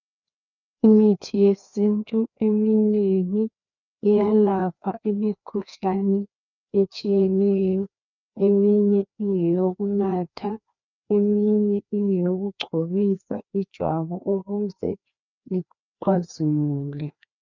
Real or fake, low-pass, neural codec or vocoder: fake; 7.2 kHz; codec, 16 kHz, 2 kbps, FreqCodec, larger model